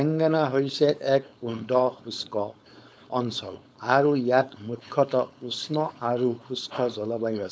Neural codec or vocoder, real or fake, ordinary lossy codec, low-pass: codec, 16 kHz, 4.8 kbps, FACodec; fake; none; none